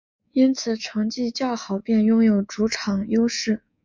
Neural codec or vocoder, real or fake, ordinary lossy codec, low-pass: codec, 24 kHz, 3.1 kbps, DualCodec; fake; MP3, 64 kbps; 7.2 kHz